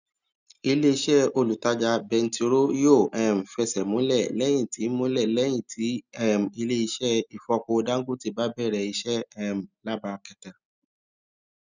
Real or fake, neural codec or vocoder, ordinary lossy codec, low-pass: real; none; none; 7.2 kHz